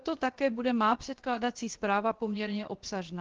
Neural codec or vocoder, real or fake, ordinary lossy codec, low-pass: codec, 16 kHz, 0.7 kbps, FocalCodec; fake; Opus, 16 kbps; 7.2 kHz